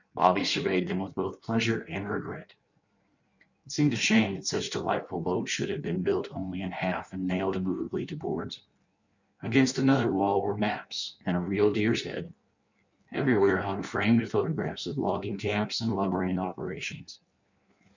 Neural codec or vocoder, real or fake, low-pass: codec, 16 kHz in and 24 kHz out, 1.1 kbps, FireRedTTS-2 codec; fake; 7.2 kHz